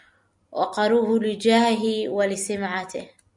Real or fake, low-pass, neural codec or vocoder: real; 10.8 kHz; none